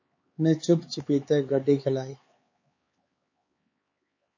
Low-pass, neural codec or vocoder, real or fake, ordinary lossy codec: 7.2 kHz; codec, 16 kHz, 4 kbps, X-Codec, HuBERT features, trained on LibriSpeech; fake; MP3, 32 kbps